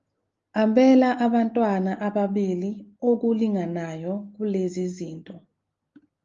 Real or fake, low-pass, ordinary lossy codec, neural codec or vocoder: real; 7.2 kHz; Opus, 32 kbps; none